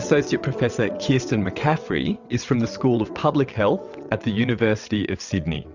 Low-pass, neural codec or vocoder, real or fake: 7.2 kHz; vocoder, 22.05 kHz, 80 mel bands, WaveNeXt; fake